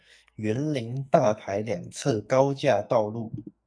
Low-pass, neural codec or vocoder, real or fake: 9.9 kHz; codec, 44.1 kHz, 2.6 kbps, SNAC; fake